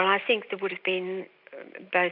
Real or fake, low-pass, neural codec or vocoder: real; 5.4 kHz; none